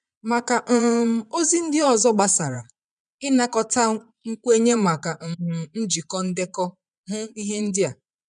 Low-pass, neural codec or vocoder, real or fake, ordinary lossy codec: 9.9 kHz; vocoder, 22.05 kHz, 80 mel bands, Vocos; fake; none